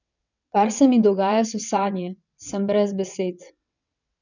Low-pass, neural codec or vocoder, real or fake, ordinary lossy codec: 7.2 kHz; vocoder, 22.05 kHz, 80 mel bands, WaveNeXt; fake; none